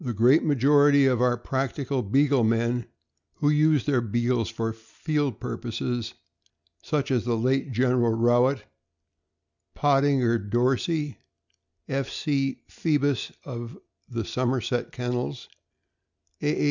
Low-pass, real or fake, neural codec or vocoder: 7.2 kHz; real; none